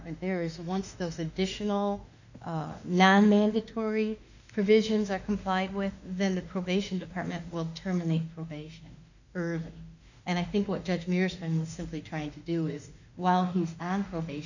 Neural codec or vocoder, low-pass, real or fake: autoencoder, 48 kHz, 32 numbers a frame, DAC-VAE, trained on Japanese speech; 7.2 kHz; fake